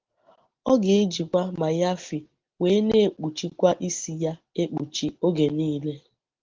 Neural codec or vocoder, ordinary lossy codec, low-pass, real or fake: none; Opus, 16 kbps; 7.2 kHz; real